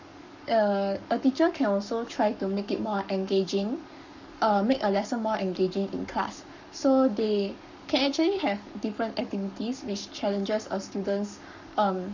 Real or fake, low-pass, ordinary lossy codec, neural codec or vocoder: fake; 7.2 kHz; none; codec, 44.1 kHz, 7.8 kbps, Pupu-Codec